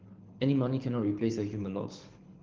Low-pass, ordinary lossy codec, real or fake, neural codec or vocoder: 7.2 kHz; Opus, 16 kbps; fake; codec, 24 kHz, 6 kbps, HILCodec